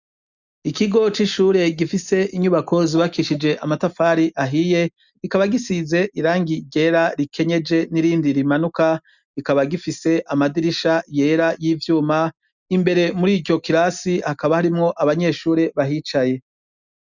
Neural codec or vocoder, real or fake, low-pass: none; real; 7.2 kHz